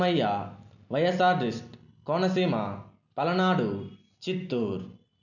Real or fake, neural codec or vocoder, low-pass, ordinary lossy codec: real; none; 7.2 kHz; none